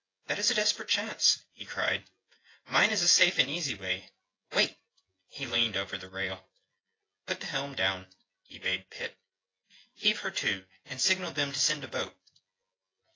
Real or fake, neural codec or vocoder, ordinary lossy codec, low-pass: fake; vocoder, 24 kHz, 100 mel bands, Vocos; AAC, 32 kbps; 7.2 kHz